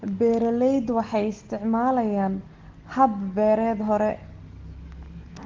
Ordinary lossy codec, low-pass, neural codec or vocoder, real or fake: Opus, 16 kbps; 7.2 kHz; none; real